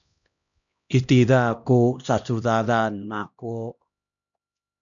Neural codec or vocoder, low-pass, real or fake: codec, 16 kHz, 1 kbps, X-Codec, HuBERT features, trained on LibriSpeech; 7.2 kHz; fake